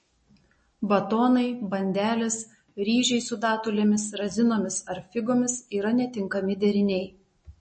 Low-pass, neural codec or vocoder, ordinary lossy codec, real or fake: 9.9 kHz; none; MP3, 32 kbps; real